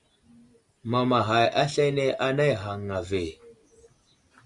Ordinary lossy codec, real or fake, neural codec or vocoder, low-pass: Opus, 64 kbps; real; none; 10.8 kHz